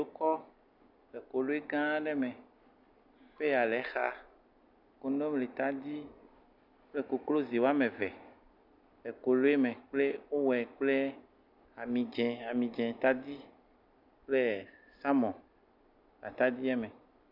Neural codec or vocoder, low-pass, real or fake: none; 5.4 kHz; real